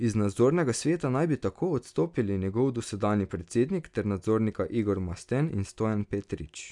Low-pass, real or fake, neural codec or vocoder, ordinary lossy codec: 10.8 kHz; real; none; none